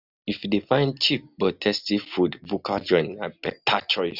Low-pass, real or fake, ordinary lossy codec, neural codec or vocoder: 5.4 kHz; real; none; none